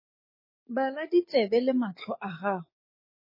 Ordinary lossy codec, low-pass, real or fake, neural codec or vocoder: MP3, 24 kbps; 5.4 kHz; fake; codec, 16 kHz, 6 kbps, DAC